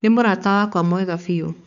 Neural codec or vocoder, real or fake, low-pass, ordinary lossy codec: codec, 16 kHz, 4 kbps, X-Codec, HuBERT features, trained on balanced general audio; fake; 7.2 kHz; none